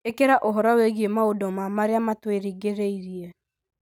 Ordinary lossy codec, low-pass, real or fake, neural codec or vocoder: none; 19.8 kHz; real; none